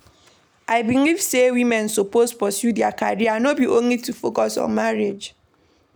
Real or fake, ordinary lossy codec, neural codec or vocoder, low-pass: real; none; none; none